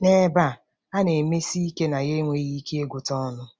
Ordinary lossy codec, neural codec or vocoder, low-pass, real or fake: none; none; none; real